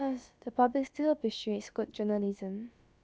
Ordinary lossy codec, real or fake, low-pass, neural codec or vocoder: none; fake; none; codec, 16 kHz, about 1 kbps, DyCAST, with the encoder's durations